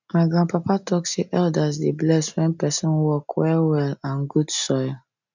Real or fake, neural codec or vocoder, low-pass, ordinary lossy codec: real; none; 7.2 kHz; none